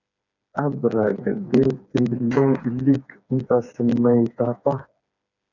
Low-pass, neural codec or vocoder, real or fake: 7.2 kHz; codec, 16 kHz, 4 kbps, FreqCodec, smaller model; fake